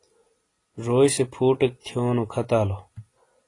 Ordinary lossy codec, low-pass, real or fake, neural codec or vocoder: AAC, 32 kbps; 10.8 kHz; real; none